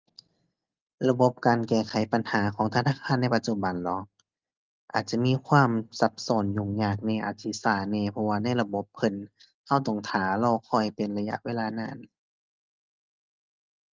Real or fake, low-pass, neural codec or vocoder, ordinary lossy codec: real; 7.2 kHz; none; Opus, 32 kbps